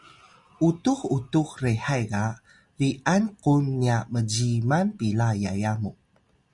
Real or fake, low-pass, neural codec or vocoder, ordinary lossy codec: real; 10.8 kHz; none; Opus, 64 kbps